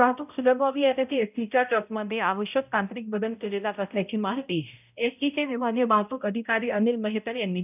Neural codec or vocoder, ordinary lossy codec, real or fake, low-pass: codec, 16 kHz, 0.5 kbps, X-Codec, HuBERT features, trained on balanced general audio; none; fake; 3.6 kHz